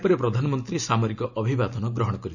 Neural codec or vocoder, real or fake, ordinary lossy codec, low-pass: none; real; none; 7.2 kHz